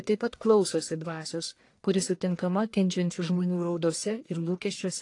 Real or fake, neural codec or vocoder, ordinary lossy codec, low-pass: fake; codec, 44.1 kHz, 1.7 kbps, Pupu-Codec; AAC, 48 kbps; 10.8 kHz